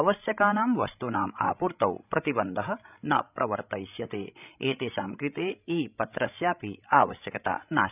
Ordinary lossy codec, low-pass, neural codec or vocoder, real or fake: none; 3.6 kHz; codec, 16 kHz, 16 kbps, FreqCodec, larger model; fake